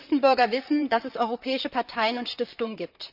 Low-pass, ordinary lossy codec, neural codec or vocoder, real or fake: 5.4 kHz; none; vocoder, 44.1 kHz, 128 mel bands, Pupu-Vocoder; fake